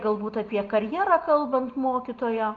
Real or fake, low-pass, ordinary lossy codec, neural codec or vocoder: real; 7.2 kHz; Opus, 32 kbps; none